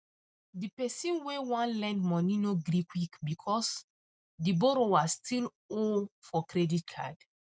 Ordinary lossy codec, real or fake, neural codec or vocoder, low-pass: none; real; none; none